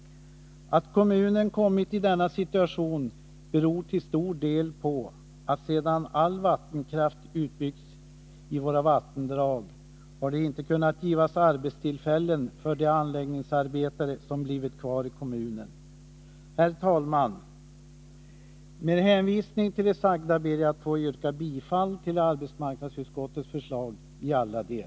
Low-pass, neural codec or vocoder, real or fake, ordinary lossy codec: none; none; real; none